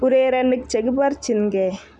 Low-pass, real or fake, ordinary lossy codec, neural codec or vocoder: 10.8 kHz; real; none; none